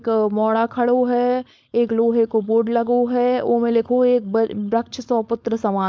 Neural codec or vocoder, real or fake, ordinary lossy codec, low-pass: codec, 16 kHz, 4.8 kbps, FACodec; fake; none; none